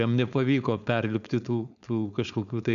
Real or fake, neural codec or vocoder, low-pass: fake; codec, 16 kHz, 4.8 kbps, FACodec; 7.2 kHz